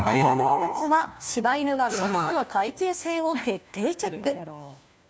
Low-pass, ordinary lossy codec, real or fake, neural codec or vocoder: none; none; fake; codec, 16 kHz, 1 kbps, FunCodec, trained on LibriTTS, 50 frames a second